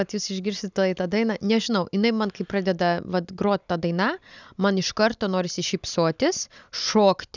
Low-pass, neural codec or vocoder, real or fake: 7.2 kHz; none; real